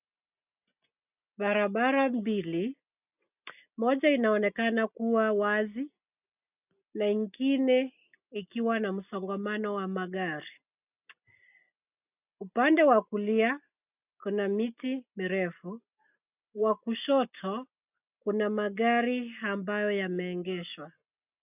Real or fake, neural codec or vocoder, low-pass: real; none; 3.6 kHz